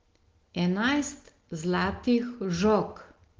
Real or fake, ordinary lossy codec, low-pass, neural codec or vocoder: real; Opus, 16 kbps; 7.2 kHz; none